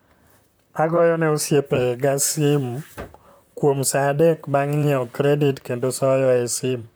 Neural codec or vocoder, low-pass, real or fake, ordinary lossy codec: vocoder, 44.1 kHz, 128 mel bands, Pupu-Vocoder; none; fake; none